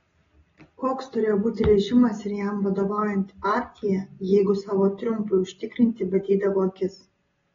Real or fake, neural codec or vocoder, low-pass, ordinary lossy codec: real; none; 7.2 kHz; AAC, 24 kbps